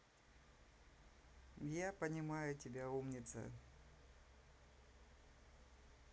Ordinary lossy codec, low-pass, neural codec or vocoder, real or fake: none; none; none; real